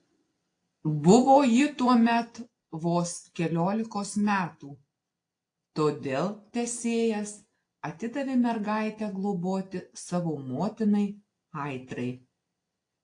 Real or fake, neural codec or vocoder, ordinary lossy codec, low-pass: real; none; AAC, 48 kbps; 9.9 kHz